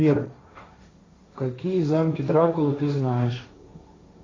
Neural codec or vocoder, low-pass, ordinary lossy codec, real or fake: codec, 16 kHz, 1.1 kbps, Voila-Tokenizer; 7.2 kHz; AAC, 32 kbps; fake